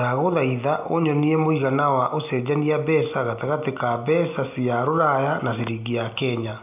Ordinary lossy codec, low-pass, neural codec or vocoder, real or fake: AAC, 32 kbps; 3.6 kHz; none; real